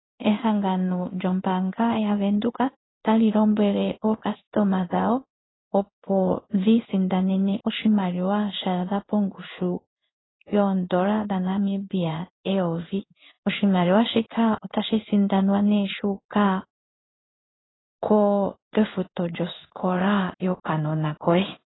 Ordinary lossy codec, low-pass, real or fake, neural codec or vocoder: AAC, 16 kbps; 7.2 kHz; fake; codec, 16 kHz in and 24 kHz out, 1 kbps, XY-Tokenizer